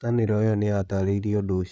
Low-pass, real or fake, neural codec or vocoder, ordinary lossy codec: none; fake; codec, 16 kHz, 8 kbps, FreqCodec, larger model; none